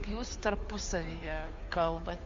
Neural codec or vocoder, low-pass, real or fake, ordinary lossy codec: codec, 16 kHz, 2 kbps, FunCodec, trained on Chinese and English, 25 frames a second; 7.2 kHz; fake; MP3, 48 kbps